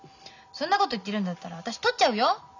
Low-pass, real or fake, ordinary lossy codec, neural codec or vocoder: 7.2 kHz; real; none; none